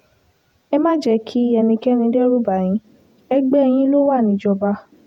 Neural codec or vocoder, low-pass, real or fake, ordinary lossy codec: vocoder, 48 kHz, 128 mel bands, Vocos; 19.8 kHz; fake; none